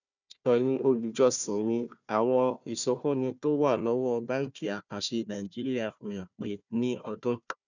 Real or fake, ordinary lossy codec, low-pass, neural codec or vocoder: fake; none; 7.2 kHz; codec, 16 kHz, 1 kbps, FunCodec, trained on Chinese and English, 50 frames a second